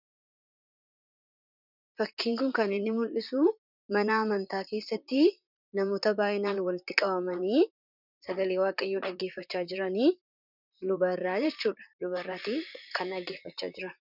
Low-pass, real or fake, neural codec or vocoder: 5.4 kHz; fake; vocoder, 44.1 kHz, 128 mel bands, Pupu-Vocoder